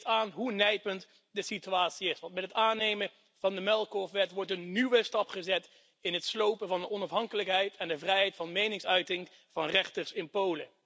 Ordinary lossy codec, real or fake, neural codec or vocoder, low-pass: none; real; none; none